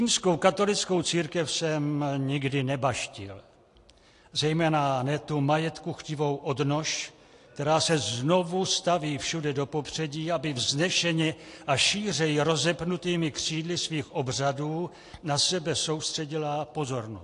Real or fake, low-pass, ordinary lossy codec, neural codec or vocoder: real; 10.8 kHz; AAC, 48 kbps; none